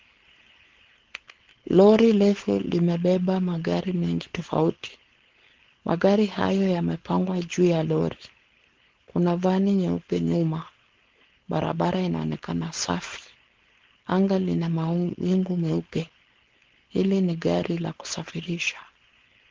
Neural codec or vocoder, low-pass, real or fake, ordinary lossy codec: codec, 16 kHz, 4.8 kbps, FACodec; 7.2 kHz; fake; Opus, 16 kbps